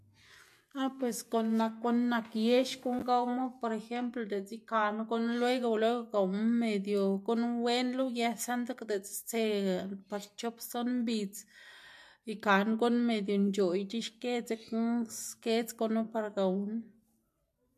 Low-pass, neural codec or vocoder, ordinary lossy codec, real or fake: 14.4 kHz; none; MP3, 64 kbps; real